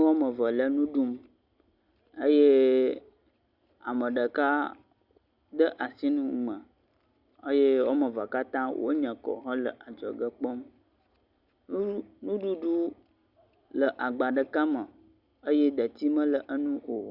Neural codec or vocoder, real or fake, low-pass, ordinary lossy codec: none; real; 5.4 kHz; Opus, 64 kbps